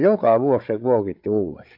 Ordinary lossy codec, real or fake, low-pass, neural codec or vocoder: MP3, 48 kbps; fake; 5.4 kHz; codec, 16 kHz, 8 kbps, FreqCodec, larger model